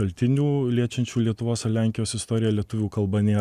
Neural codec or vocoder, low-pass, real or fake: none; 14.4 kHz; real